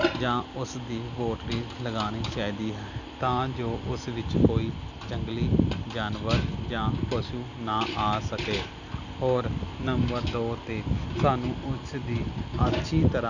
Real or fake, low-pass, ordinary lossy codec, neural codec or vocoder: real; 7.2 kHz; none; none